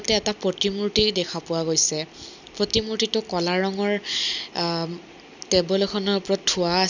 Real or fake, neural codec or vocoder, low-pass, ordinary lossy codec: real; none; 7.2 kHz; none